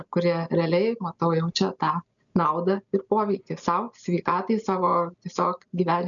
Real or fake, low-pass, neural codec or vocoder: real; 7.2 kHz; none